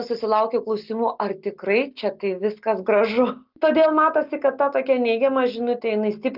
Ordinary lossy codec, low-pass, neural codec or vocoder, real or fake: Opus, 24 kbps; 5.4 kHz; none; real